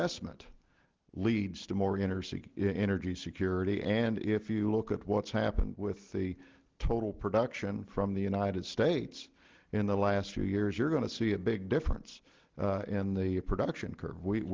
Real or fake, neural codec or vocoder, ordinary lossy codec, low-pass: real; none; Opus, 16 kbps; 7.2 kHz